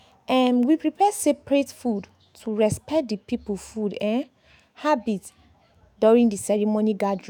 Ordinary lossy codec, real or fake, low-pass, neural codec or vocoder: none; fake; none; autoencoder, 48 kHz, 128 numbers a frame, DAC-VAE, trained on Japanese speech